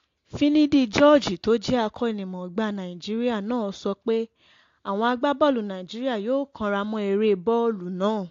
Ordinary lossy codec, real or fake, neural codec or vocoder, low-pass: AAC, 48 kbps; real; none; 7.2 kHz